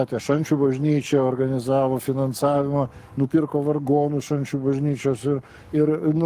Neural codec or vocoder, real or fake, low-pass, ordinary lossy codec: codec, 44.1 kHz, 7.8 kbps, Pupu-Codec; fake; 14.4 kHz; Opus, 24 kbps